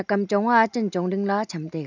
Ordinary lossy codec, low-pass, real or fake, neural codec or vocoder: none; 7.2 kHz; real; none